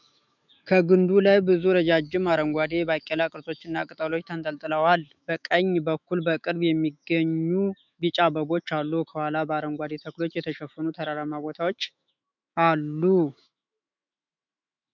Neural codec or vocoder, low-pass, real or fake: autoencoder, 48 kHz, 128 numbers a frame, DAC-VAE, trained on Japanese speech; 7.2 kHz; fake